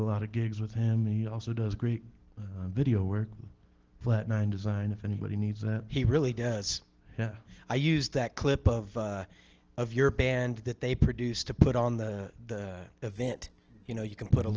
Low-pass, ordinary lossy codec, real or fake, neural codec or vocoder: 7.2 kHz; Opus, 16 kbps; real; none